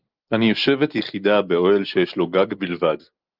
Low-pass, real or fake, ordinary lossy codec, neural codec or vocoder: 5.4 kHz; real; Opus, 24 kbps; none